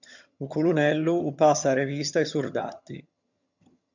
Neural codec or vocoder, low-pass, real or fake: vocoder, 22.05 kHz, 80 mel bands, HiFi-GAN; 7.2 kHz; fake